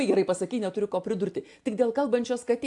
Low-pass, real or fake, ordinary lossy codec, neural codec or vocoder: 10.8 kHz; real; AAC, 64 kbps; none